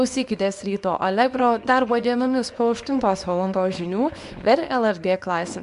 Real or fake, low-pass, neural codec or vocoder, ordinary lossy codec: fake; 10.8 kHz; codec, 24 kHz, 0.9 kbps, WavTokenizer, small release; MP3, 64 kbps